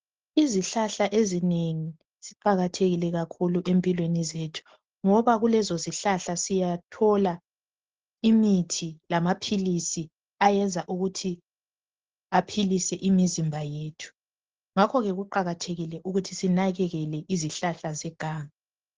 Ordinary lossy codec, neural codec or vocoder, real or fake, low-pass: Opus, 16 kbps; none; real; 7.2 kHz